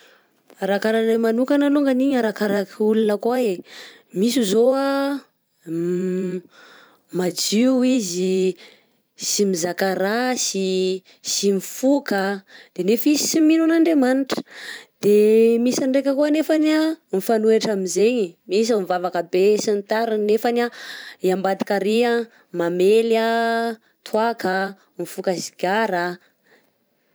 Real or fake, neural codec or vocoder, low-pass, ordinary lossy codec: fake; vocoder, 44.1 kHz, 128 mel bands every 512 samples, BigVGAN v2; none; none